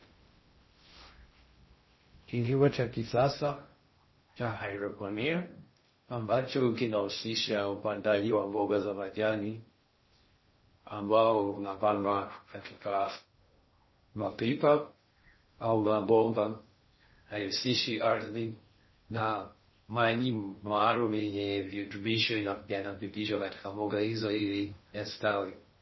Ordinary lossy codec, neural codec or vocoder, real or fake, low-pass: MP3, 24 kbps; codec, 16 kHz in and 24 kHz out, 0.6 kbps, FocalCodec, streaming, 2048 codes; fake; 7.2 kHz